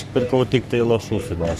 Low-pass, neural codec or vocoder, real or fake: 14.4 kHz; codec, 44.1 kHz, 3.4 kbps, Pupu-Codec; fake